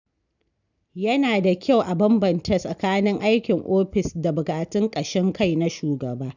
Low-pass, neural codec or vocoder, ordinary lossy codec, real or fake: 7.2 kHz; none; none; real